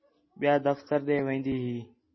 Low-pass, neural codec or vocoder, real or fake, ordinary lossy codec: 7.2 kHz; none; real; MP3, 24 kbps